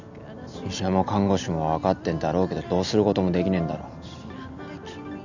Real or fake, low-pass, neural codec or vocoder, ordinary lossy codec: real; 7.2 kHz; none; none